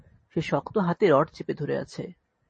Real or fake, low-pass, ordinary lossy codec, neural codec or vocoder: real; 9.9 kHz; MP3, 32 kbps; none